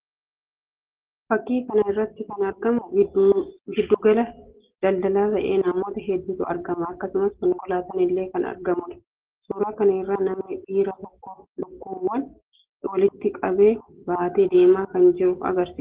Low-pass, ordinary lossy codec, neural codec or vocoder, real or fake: 3.6 kHz; Opus, 16 kbps; none; real